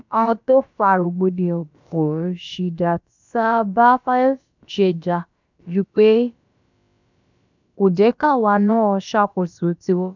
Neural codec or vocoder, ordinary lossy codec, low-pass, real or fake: codec, 16 kHz, about 1 kbps, DyCAST, with the encoder's durations; none; 7.2 kHz; fake